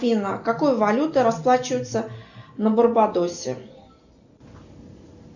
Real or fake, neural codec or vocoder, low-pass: real; none; 7.2 kHz